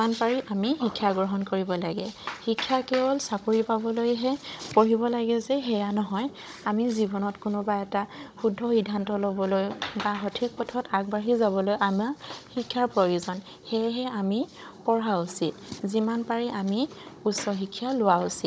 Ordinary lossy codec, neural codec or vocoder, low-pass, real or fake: none; codec, 16 kHz, 16 kbps, FunCodec, trained on Chinese and English, 50 frames a second; none; fake